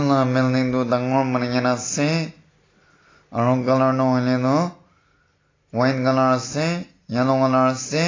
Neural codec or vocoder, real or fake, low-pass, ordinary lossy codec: none; real; 7.2 kHz; AAC, 32 kbps